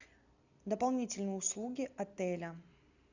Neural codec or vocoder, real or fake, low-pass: none; real; 7.2 kHz